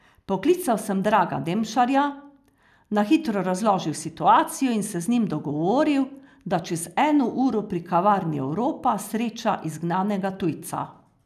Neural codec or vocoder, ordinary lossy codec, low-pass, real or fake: none; none; 14.4 kHz; real